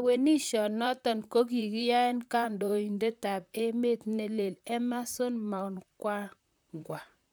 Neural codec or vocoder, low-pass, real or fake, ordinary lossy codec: vocoder, 44.1 kHz, 128 mel bands, Pupu-Vocoder; none; fake; none